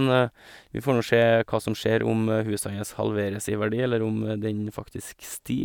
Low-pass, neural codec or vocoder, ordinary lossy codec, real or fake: 19.8 kHz; none; none; real